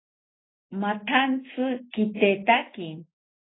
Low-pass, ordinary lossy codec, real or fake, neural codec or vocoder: 7.2 kHz; AAC, 16 kbps; real; none